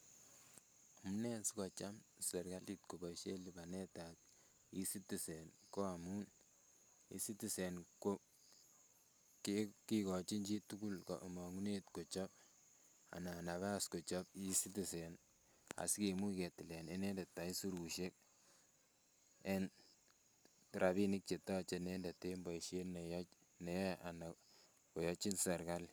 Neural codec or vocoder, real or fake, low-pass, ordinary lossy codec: none; real; none; none